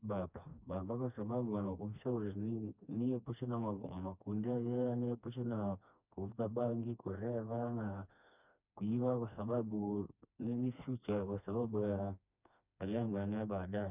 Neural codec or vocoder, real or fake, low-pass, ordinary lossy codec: codec, 16 kHz, 2 kbps, FreqCodec, smaller model; fake; 3.6 kHz; none